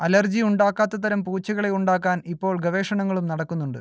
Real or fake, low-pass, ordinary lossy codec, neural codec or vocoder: real; none; none; none